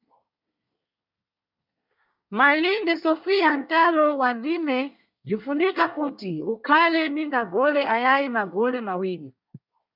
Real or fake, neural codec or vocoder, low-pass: fake; codec, 24 kHz, 1 kbps, SNAC; 5.4 kHz